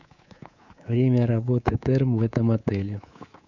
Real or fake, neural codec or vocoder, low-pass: fake; codec, 24 kHz, 3.1 kbps, DualCodec; 7.2 kHz